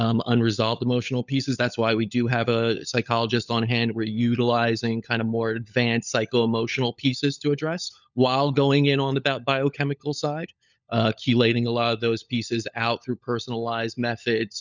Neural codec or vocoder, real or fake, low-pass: codec, 16 kHz, 16 kbps, FunCodec, trained on LibriTTS, 50 frames a second; fake; 7.2 kHz